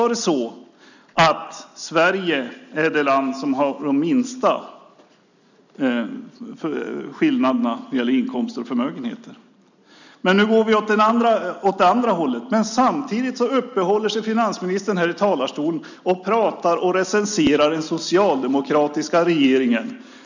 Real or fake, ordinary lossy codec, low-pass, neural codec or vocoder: real; none; 7.2 kHz; none